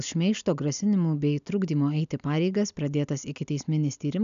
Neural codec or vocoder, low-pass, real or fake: none; 7.2 kHz; real